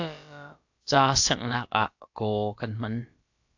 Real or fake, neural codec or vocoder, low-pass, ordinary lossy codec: fake; codec, 16 kHz, about 1 kbps, DyCAST, with the encoder's durations; 7.2 kHz; AAC, 48 kbps